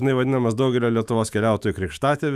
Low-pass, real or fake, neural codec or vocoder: 14.4 kHz; fake; autoencoder, 48 kHz, 128 numbers a frame, DAC-VAE, trained on Japanese speech